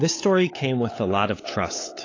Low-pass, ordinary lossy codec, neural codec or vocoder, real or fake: 7.2 kHz; AAC, 32 kbps; codec, 16 kHz, 8 kbps, FunCodec, trained on LibriTTS, 25 frames a second; fake